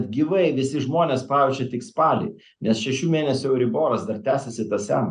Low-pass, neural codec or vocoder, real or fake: 9.9 kHz; none; real